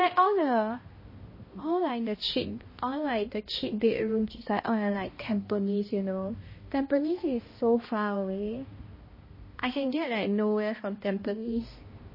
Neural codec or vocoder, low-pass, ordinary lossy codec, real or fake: codec, 16 kHz, 1 kbps, X-Codec, HuBERT features, trained on balanced general audio; 5.4 kHz; MP3, 24 kbps; fake